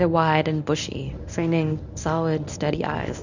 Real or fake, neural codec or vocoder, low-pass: fake; codec, 24 kHz, 0.9 kbps, WavTokenizer, medium speech release version 1; 7.2 kHz